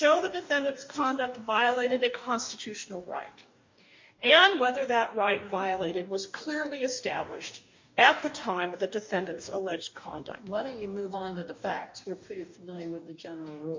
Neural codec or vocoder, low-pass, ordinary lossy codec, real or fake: codec, 44.1 kHz, 2.6 kbps, DAC; 7.2 kHz; MP3, 48 kbps; fake